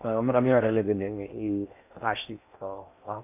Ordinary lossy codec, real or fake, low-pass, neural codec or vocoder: none; fake; 3.6 kHz; codec, 16 kHz in and 24 kHz out, 0.6 kbps, FocalCodec, streaming, 4096 codes